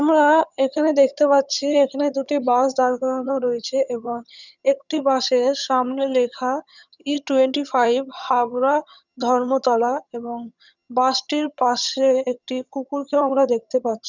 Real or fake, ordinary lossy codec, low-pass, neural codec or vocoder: fake; none; 7.2 kHz; vocoder, 22.05 kHz, 80 mel bands, HiFi-GAN